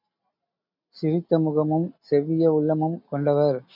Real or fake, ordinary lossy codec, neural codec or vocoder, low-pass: real; MP3, 48 kbps; none; 5.4 kHz